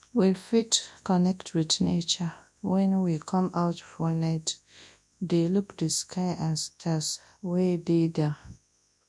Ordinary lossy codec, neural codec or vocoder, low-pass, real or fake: none; codec, 24 kHz, 0.9 kbps, WavTokenizer, large speech release; none; fake